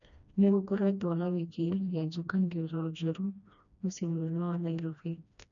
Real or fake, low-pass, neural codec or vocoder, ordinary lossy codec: fake; 7.2 kHz; codec, 16 kHz, 1 kbps, FreqCodec, smaller model; none